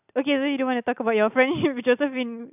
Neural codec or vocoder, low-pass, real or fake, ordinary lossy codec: none; 3.6 kHz; real; none